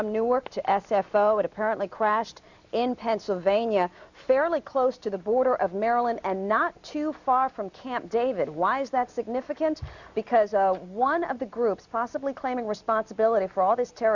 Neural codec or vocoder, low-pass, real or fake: none; 7.2 kHz; real